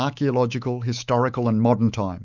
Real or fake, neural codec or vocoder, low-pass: fake; autoencoder, 48 kHz, 128 numbers a frame, DAC-VAE, trained on Japanese speech; 7.2 kHz